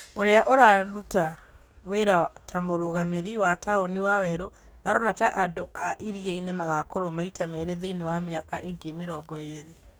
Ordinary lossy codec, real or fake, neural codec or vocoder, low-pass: none; fake; codec, 44.1 kHz, 2.6 kbps, DAC; none